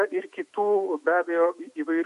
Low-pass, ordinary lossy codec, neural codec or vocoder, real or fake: 14.4 kHz; MP3, 48 kbps; autoencoder, 48 kHz, 128 numbers a frame, DAC-VAE, trained on Japanese speech; fake